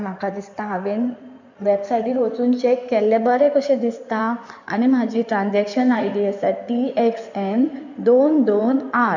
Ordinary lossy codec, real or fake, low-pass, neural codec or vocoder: none; fake; 7.2 kHz; codec, 16 kHz in and 24 kHz out, 2.2 kbps, FireRedTTS-2 codec